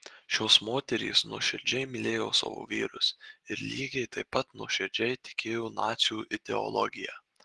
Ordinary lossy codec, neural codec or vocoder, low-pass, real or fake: Opus, 16 kbps; none; 10.8 kHz; real